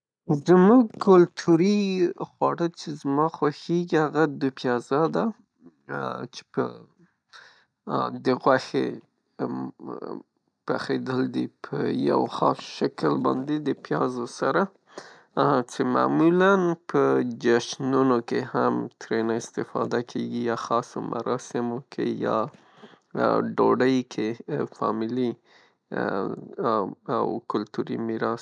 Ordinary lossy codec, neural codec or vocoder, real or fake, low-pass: none; none; real; 9.9 kHz